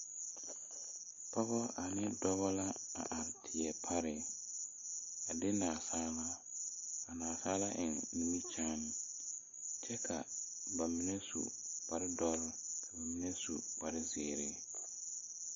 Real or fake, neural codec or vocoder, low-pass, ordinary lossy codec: real; none; 7.2 kHz; MP3, 32 kbps